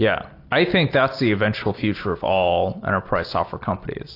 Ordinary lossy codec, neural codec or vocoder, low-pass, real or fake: AAC, 32 kbps; none; 5.4 kHz; real